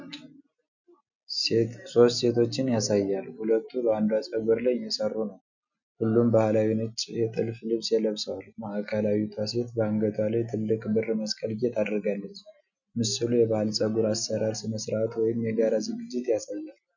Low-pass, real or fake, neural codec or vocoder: 7.2 kHz; real; none